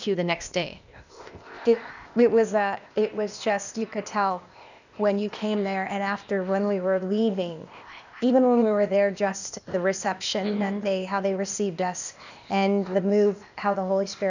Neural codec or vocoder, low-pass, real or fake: codec, 16 kHz, 0.8 kbps, ZipCodec; 7.2 kHz; fake